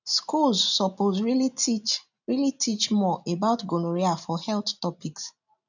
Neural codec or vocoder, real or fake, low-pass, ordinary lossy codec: none; real; 7.2 kHz; none